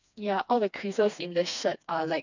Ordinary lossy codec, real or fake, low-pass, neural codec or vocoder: none; fake; 7.2 kHz; codec, 16 kHz, 2 kbps, FreqCodec, smaller model